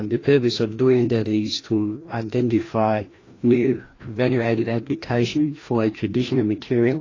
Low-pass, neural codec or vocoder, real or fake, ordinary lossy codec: 7.2 kHz; codec, 16 kHz, 1 kbps, FreqCodec, larger model; fake; AAC, 32 kbps